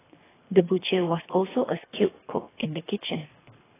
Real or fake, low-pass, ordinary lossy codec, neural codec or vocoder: fake; 3.6 kHz; AAC, 16 kbps; codec, 24 kHz, 3 kbps, HILCodec